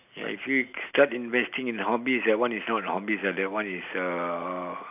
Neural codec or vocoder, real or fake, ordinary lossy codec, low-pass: none; real; none; 3.6 kHz